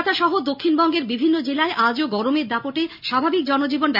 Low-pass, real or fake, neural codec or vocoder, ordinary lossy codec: 5.4 kHz; real; none; none